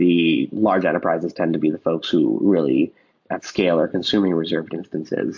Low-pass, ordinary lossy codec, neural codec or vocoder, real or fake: 7.2 kHz; MP3, 48 kbps; none; real